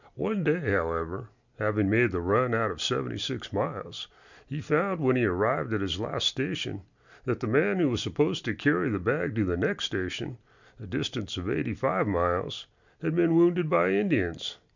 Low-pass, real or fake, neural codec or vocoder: 7.2 kHz; real; none